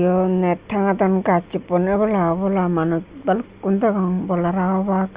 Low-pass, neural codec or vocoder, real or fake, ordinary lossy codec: 3.6 kHz; none; real; Opus, 64 kbps